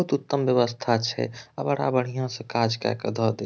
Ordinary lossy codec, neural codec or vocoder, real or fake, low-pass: none; none; real; none